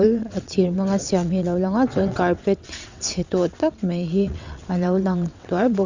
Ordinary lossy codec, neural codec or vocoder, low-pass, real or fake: Opus, 64 kbps; vocoder, 22.05 kHz, 80 mel bands, WaveNeXt; 7.2 kHz; fake